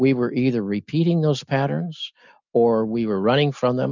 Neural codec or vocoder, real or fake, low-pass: none; real; 7.2 kHz